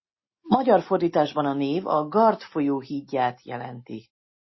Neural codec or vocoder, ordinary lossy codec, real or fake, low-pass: none; MP3, 24 kbps; real; 7.2 kHz